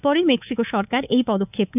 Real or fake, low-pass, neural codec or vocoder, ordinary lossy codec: fake; 3.6 kHz; codec, 24 kHz, 3.1 kbps, DualCodec; none